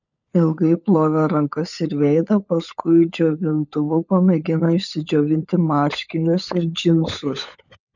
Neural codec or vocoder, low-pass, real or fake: codec, 16 kHz, 16 kbps, FunCodec, trained on LibriTTS, 50 frames a second; 7.2 kHz; fake